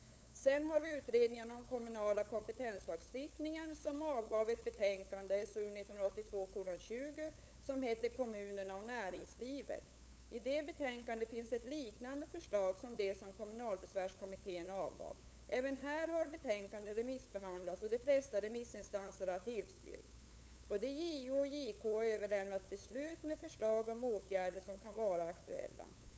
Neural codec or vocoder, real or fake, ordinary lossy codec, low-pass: codec, 16 kHz, 8 kbps, FunCodec, trained on LibriTTS, 25 frames a second; fake; none; none